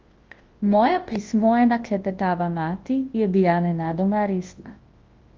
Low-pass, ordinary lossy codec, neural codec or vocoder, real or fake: 7.2 kHz; Opus, 16 kbps; codec, 24 kHz, 0.9 kbps, WavTokenizer, large speech release; fake